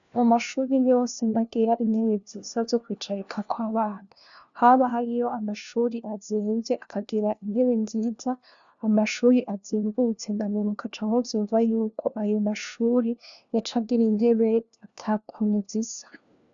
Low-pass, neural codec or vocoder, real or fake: 7.2 kHz; codec, 16 kHz, 1 kbps, FunCodec, trained on LibriTTS, 50 frames a second; fake